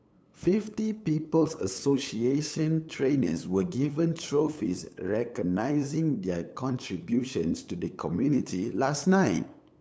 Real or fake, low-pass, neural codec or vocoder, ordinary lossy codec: fake; none; codec, 16 kHz, 8 kbps, FunCodec, trained on LibriTTS, 25 frames a second; none